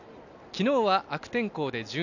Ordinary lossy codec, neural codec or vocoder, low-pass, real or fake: none; none; 7.2 kHz; real